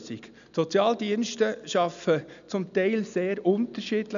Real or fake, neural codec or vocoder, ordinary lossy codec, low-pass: real; none; none; 7.2 kHz